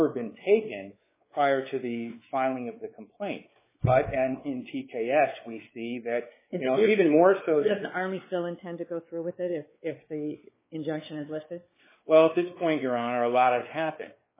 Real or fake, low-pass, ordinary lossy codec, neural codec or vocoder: fake; 3.6 kHz; MP3, 16 kbps; codec, 16 kHz, 4 kbps, X-Codec, WavLM features, trained on Multilingual LibriSpeech